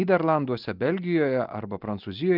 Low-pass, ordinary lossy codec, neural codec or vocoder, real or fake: 5.4 kHz; Opus, 32 kbps; none; real